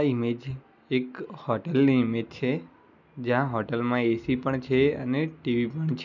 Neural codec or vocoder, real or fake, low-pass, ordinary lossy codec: none; real; 7.2 kHz; none